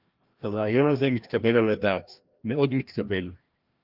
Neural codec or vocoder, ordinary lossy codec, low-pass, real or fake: codec, 16 kHz, 1 kbps, FreqCodec, larger model; Opus, 32 kbps; 5.4 kHz; fake